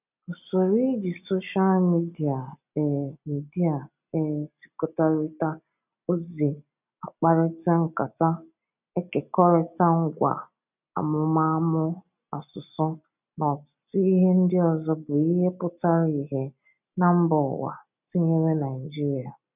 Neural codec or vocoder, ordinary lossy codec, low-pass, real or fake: none; none; 3.6 kHz; real